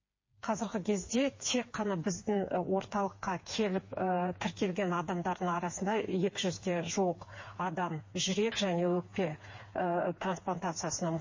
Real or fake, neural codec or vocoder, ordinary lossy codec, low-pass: fake; codec, 16 kHz, 4 kbps, FreqCodec, smaller model; MP3, 32 kbps; 7.2 kHz